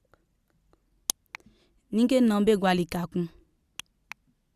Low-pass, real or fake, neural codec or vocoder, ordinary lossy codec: 14.4 kHz; real; none; Opus, 64 kbps